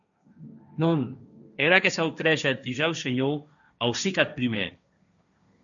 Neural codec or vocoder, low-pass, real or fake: codec, 16 kHz, 1.1 kbps, Voila-Tokenizer; 7.2 kHz; fake